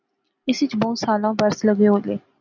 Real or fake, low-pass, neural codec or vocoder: real; 7.2 kHz; none